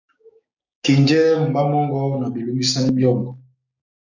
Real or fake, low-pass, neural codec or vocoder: fake; 7.2 kHz; codec, 16 kHz, 6 kbps, DAC